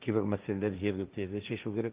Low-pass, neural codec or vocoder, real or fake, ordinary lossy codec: 3.6 kHz; codec, 16 kHz, 1.1 kbps, Voila-Tokenizer; fake; Opus, 32 kbps